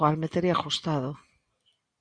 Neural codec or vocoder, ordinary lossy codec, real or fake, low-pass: none; Opus, 64 kbps; real; 9.9 kHz